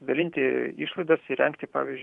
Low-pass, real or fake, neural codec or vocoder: 10.8 kHz; fake; vocoder, 44.1 kHz, 128 mel bands every 512 samples, BigVGAN v2